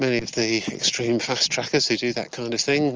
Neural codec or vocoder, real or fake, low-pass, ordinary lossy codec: none; real; 7.2 kHz; Opus, 24 kbps